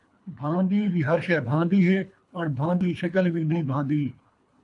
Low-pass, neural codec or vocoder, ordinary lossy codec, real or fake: 10.8 kHz; codec, 24 kHz, 3 kbps, HILCodec; AAC, 64 kbps; fake